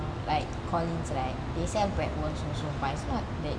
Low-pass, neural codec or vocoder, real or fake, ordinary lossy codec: 9.9 kHz; none; real; none